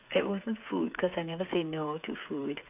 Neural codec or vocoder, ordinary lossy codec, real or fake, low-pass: codec, 16 kHz, 8 kbps, FreqCodec, smaller model; AAC, 32 kbps; fake; 3.6 kHz